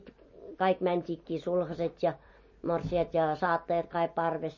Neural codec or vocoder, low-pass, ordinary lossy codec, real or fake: none; 7.2 kHz; MP3, 32 kbps; real